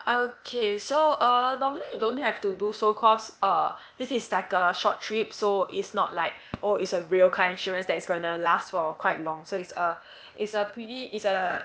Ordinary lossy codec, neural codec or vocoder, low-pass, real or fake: none; codec, 16 kHz, 0.8 kbps, ZipCodec; none; fake